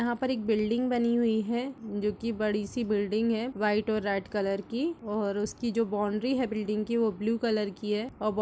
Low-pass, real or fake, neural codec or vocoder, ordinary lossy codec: none; real; none; none